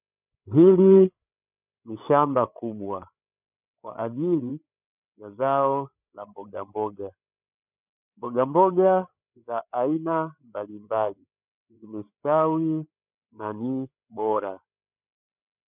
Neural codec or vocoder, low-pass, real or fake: codec, 16 kHz, 8 kbps, FreqCodec, larger model; 3.6 kHz; fake